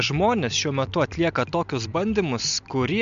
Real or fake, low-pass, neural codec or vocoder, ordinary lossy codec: real; 7.2 kHz; none; MP3, 64 kbps